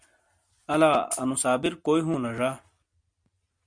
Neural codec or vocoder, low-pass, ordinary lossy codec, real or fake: none; 9.9 kHz; MP3, 64 kbps; real